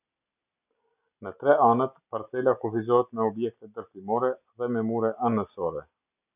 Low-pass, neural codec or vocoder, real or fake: 3.6 kHz; none; real